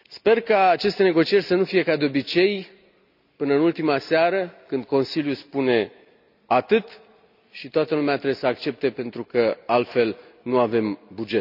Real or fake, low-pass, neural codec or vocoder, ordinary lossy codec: real; 5.4 kHz; none; none